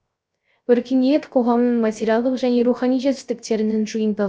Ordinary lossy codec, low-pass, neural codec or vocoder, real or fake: none; none; codec, 16 kHz, 0.3 kbps, FocalCodec; fake